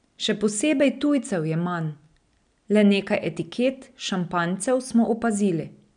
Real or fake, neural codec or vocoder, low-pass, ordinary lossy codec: real; none; 9.9 kHz; none